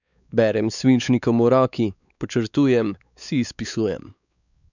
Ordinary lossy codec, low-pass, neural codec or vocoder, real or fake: none; 7.2 kHz; codec, 16 kHz, 4 kbps, X-Codec, WavLM features, trained on Multilingual LibriSpeech; fake